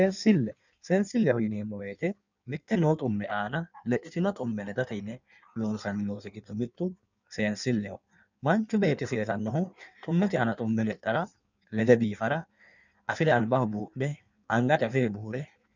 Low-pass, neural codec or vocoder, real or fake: 7.2 kHz; codec, 16 kHz in and 24 kHz out, 1.1 kbps, FireRedTTS-2 codec; fake